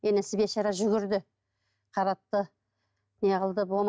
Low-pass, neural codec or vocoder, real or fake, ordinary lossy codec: none; none; real; none